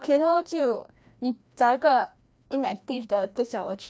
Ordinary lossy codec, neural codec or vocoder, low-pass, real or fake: none; codec, 16 kHz, 1 kbps, FreqCodec, larger model; none; fake